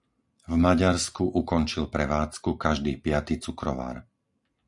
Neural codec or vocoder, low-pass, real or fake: none; 10.8 kHz; real